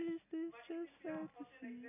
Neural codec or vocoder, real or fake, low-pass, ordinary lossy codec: none; real; 3.6 kHz; none